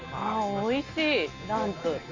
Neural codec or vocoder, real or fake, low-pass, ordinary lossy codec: none; real; 7.2 kHz; Opus, 32 kbps